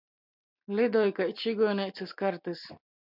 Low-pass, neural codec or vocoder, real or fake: 5.4 kHz; none; real